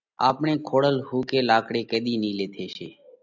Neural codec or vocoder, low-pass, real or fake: none; 7.2 kHz; real